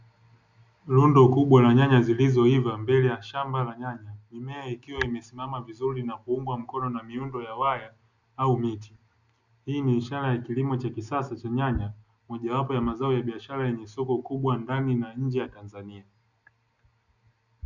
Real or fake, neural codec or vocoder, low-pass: real; none; 7.2 kHz